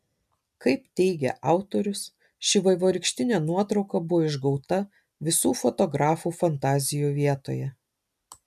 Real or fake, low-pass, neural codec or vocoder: real; 14.4 kHz; none